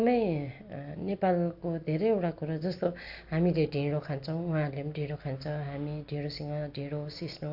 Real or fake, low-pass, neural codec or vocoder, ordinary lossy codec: real; 5.4 kHz; none; AAC, 48 kbps